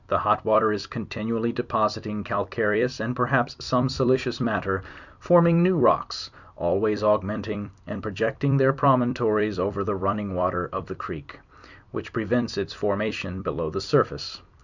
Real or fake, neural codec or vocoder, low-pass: fake; vocoder, 44.1 kHz, 128 mel bands every 256 samples, BigVGAN v2; 7.2 kHz